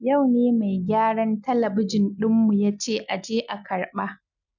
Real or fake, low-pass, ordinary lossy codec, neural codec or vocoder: real; 7.2 kHz; none; none